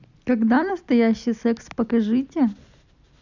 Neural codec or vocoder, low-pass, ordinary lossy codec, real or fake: none; 7.2 kHz; none; real